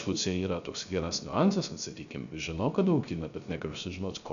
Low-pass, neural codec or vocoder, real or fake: 7.2 kHz; codec, 16 kHz, 0.3 kbps, FocalCodec; fake